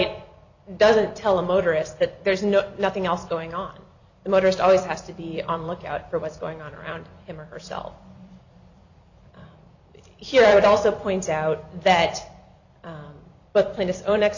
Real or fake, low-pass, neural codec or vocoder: fake; 7.2 kHz; vocoder, 44.1 kHz, 128 mel bands every 256 samples, BigVGAN v2